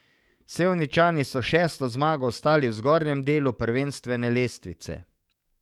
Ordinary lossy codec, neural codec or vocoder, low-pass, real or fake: none; codec, 44.1 kHz, 7.8 kbps, DAC; 19.8 kHz; fake